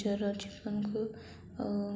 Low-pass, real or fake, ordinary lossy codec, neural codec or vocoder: none; real; none; none